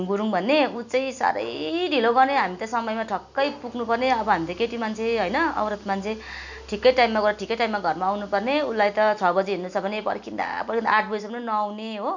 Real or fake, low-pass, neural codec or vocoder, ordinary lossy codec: real; 7.2 kHz; none; none